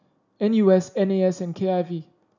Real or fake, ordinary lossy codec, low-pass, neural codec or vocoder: real; none; 7.2 kHz; none